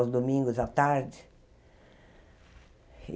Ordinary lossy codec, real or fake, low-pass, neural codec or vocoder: none; real; none; none